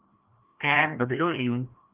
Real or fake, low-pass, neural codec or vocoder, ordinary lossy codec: fake; 3.6 kHz; codec, 16 kHz, 1 kbps, FreqCodec, larger model; Opus, 24 kbps